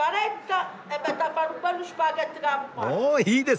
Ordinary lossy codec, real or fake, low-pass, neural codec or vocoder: none; real; none; none